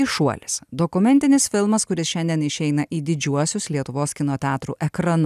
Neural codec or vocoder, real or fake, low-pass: none; real; 14.4 kHz